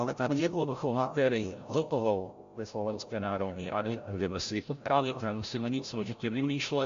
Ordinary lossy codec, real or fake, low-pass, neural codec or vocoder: MP3, 48 kbps; fake; 7.2 kHz; codec, 16 kHz, 0.5 kbps, FreqCodec, larger model